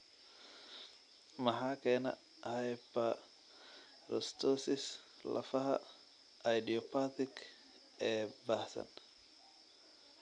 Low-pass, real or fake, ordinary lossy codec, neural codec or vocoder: 9.9 kHz; real; none; none